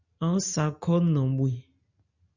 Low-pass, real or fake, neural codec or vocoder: 7.2 kHz; real; none